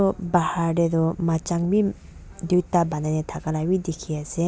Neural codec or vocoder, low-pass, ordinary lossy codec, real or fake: none; none; none; real